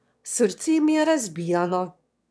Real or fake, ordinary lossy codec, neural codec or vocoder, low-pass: fake; none; autoencoder, 22.05 kHz, a latent of 192 numbers a frame, VITS, trained on one speaker; none